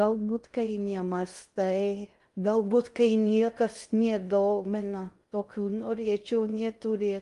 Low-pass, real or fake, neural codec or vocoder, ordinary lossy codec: 10.8 kHz; fake; codec, 16 kHz in and 24 kHz out, 0.6 kbps, FocalCodec, streaming, 2048 codes; Opus, 32 kbps